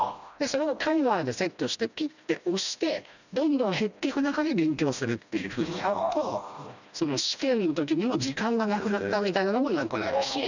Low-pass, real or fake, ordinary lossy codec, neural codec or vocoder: 7.2 kHz; fake; none; codec, 16 kHz, 1 kbps, FreqCodec, smaller model